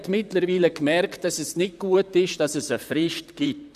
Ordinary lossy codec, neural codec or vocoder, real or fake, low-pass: none; vocoder, 44.1 kHz, 128 mel bands, Pupu-Vocoder; fake; 14.4 kHz